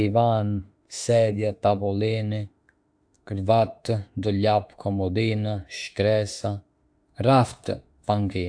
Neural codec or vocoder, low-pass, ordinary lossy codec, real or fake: autoencoder, 48 kHz, 32 numbers a frame, DAC-VAE, trained on Japanese speech; 9.9 kHz; AAC, 64 kbps; fake